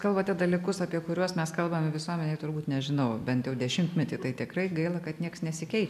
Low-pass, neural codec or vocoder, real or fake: 14.4 kHz; none; real